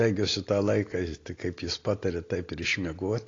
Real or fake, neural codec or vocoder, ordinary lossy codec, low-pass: real; none; AAC, 32 kbps; 7.2 kHz